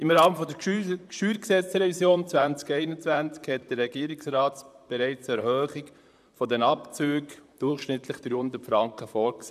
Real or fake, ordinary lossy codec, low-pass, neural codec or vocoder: fake; none; 14.4 kHz; vocoder, 44.1 kHz, 128 mel bands, Pupu-Vocoder